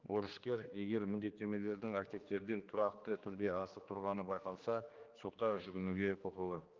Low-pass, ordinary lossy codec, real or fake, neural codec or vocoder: 7.2 kHz; Opus, 32 kbps; fake; codec, 16 kHz, 2 kbps, X-Codec, HuBERT features, trained on general audio